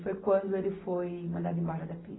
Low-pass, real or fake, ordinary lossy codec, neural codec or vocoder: 7.2 kHz; real; AAC, 16 kbps; none